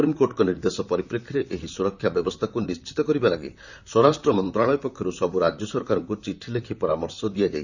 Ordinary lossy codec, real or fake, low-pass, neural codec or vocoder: none; fake; 7.2 kHz; vocoder, 44.1 kHz, 128 mel bands, Pupu-Vocoder